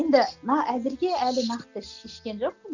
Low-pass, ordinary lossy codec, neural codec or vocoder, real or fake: 7.2 kHz; none; none; real